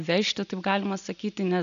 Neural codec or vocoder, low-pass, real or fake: none; 7.2 kHz; real